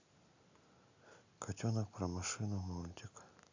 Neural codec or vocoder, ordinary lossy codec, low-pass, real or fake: none; AAC, 48 kbps; 7.2 kHz; real